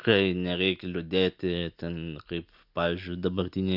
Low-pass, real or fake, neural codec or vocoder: 5.4 kHz; real; none